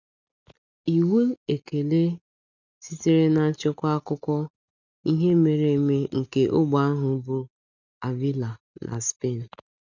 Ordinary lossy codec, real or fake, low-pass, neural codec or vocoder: none; real; 7.2 kHz; none